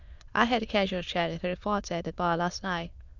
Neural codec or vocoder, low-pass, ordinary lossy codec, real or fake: autoencoder, 22.05 kHz, a latent of 192 numbers a frame, VITS, trained on many speakers; 7.2 kHz; Opus, 64 kbps; fake